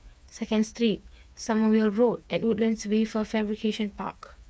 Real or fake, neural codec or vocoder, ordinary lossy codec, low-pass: fake; codec, 16 kHz, 4 kbps, FreqCodec, smaller model; none; none